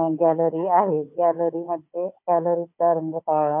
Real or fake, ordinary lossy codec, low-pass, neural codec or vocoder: fake; MP3, 32 kbps; 3.6 kHz; codec, 16 kHz, 16 kbps, FunCodec, trained on Chinese and English, 50 frames a second